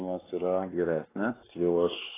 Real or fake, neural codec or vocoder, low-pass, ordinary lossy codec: fake; codec, 16 kHz, 2 kbps, X-Codec, WavLM features, trained on Multilingual LibriSpeech; 3.6 kHz; AAC, 16 kbps